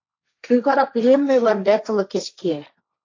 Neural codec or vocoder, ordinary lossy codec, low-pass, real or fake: codec, 16 kHz, 1.1 kbps, Voila-Tokenizer; AAC, 48 kbps; 7.2 kHz; fake